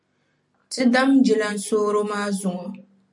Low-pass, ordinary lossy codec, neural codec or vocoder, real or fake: 10.8 kHz; MP3, 64 kbps; none; real